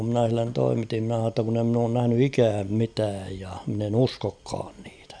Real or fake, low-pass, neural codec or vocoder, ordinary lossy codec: real; 9.9 kHz; none; none